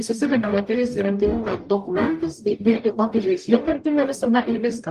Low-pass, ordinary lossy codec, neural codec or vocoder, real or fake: 14.4 kHz; Opus, 32 kbps; codec, 44.1 kHz, 0.9 kbps, DAC; fake